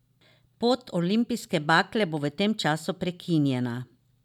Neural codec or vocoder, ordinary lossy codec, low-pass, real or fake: none; none; 19.8 kHz; real